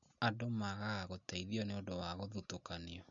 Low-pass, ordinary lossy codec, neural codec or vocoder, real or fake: 7.2 kHz; none; none; real